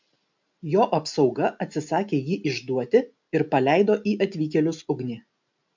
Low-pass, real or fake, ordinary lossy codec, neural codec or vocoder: 7.2 kHz; real; MP3, 64 kbps; none